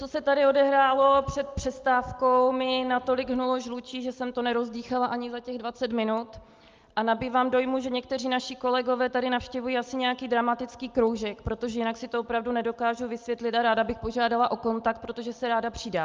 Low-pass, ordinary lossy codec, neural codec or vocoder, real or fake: 7.2 kHz; Opus, 24 kbps; none; real